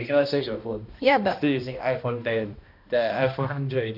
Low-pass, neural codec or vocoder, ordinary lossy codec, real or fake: 5.4 kHz; codec, 16 kHz, 1 kbps, X-Codec, HuBERT features, trained on balanced general audio; none; fake